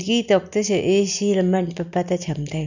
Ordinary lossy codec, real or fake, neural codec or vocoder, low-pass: none; real; none; 7.2 kHz